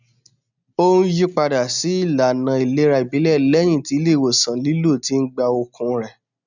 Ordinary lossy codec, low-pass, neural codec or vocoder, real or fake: none; 7.2 kHz; none; real